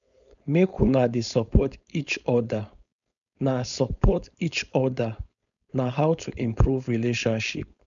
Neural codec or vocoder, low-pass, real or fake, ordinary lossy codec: codec, 16 kHz, 4.8 kbps, FACodec; 7.2 kHz; fake; none